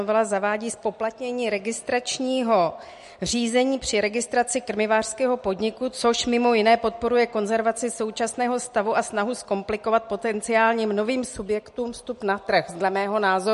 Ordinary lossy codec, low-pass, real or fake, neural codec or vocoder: MP3, 48 kbps; 14.4 kHz; real; none